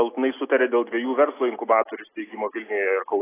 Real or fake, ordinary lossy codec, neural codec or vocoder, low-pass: real; AAC, 16 kbps; none; 3.6 kHz